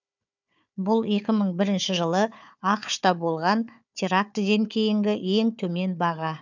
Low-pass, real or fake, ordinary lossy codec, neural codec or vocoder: 7.2 kHz; fake; none; codec, 16 kHz, 4 kbps, FunCodec, trained on Chinese and English, 50 frames a second